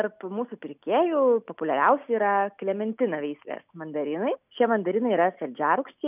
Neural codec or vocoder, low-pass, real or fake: none; 3.6 kHz; real